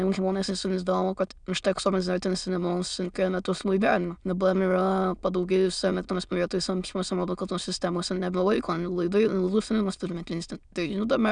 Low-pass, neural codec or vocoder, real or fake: 9.9 kHz; autoencoder, 22.05 kHz, a latent of 192 numbers a frame, VITS, trained on many speakers; fake